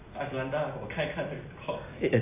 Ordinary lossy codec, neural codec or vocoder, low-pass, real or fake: AAC, 24 kbps; none; 3.6 kHz; real